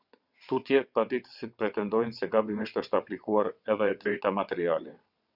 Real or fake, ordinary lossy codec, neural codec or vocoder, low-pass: fake; Opus, 64 kbps; vocoder, 22.05 kHz, 80 mel bands, WaveNeXt; 5.4 kHz